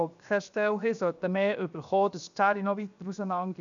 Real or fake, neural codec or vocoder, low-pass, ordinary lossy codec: fake; codec, 16 kHz, 0.7 kbps, FocalCodec; 7.2 kHz; none